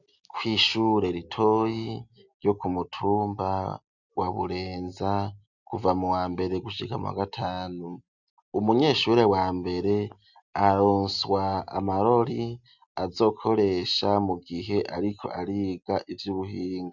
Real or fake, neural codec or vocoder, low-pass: real; none; 7.2 kHz